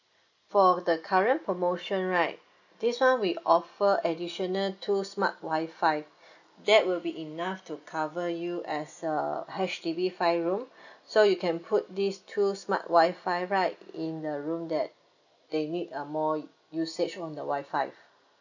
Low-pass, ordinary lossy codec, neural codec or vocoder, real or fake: 7.2 kHz; none; none; real